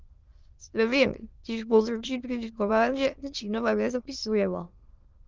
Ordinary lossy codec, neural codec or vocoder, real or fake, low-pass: Opus, 32 kbps; autoencoder, 22.05 kHz, a latent of 192 numbers a frame, VITS, trained on many speakers; fake; 7.2 kHz